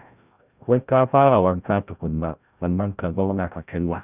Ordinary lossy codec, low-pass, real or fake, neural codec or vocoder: none; 3.6 kHz; fake; codec, 16 kHz, 0.5 kbps, FreqCodec, larger model